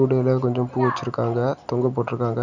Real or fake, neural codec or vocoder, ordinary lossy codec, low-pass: real; none; none; 7.2 kHz